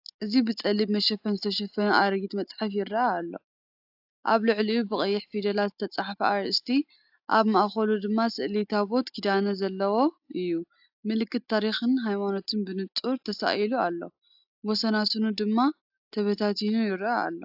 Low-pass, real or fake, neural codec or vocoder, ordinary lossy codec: 5.4 kHz; real; none; AAC, 48 kbps